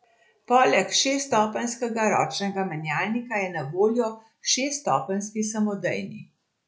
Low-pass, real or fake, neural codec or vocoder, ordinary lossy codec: none; real; none; none